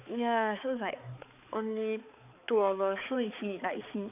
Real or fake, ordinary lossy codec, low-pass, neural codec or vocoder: fake; none; 3.6 kHz; codec, 16 kHz, 4 kbps, X-Codec, HuBERT features, trained on balanced general audio